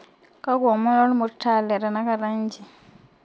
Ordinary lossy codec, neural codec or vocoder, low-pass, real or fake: none; none; none; real